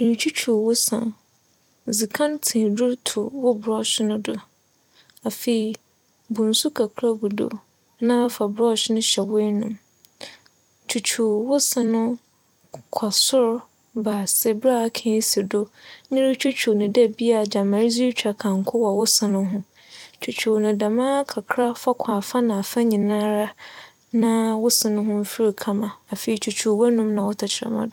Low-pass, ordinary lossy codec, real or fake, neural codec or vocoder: 19.8 kHz; none; fake; vocoder, 44.1 kHz, 128 mel bands every 256 samples, BigVGAN v2